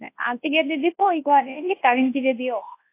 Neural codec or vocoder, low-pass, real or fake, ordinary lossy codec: codec, 24 kHz, 0.9 kbps, WavTokenizer, large speech release; 3.6 kHz; fake; AAC, 24 kbps